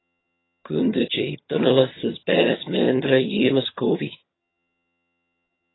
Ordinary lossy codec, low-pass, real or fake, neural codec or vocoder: AAC, 16 kbps; 7.2 kHz; fake; vocoder, 22.05 kHz, 80 mel bands, HiFi-GAN